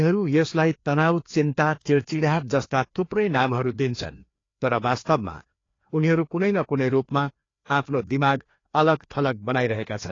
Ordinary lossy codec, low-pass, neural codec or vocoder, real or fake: AAC, 32 kbps; 7.2 kHz; codec, 16 kHz, 2 kbps, FreqCodec, larger model; fake